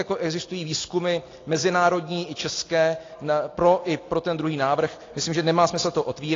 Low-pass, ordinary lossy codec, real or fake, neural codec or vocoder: 7.2 kHz; AAC, 32 kbps; real; none